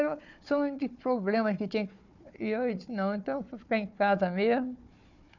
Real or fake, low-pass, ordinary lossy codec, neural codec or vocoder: fake; 7.2 kHz; none; codec, 16 kHz, 4 kbps, FunCodec, trained on Chinese and English, 50 frames a second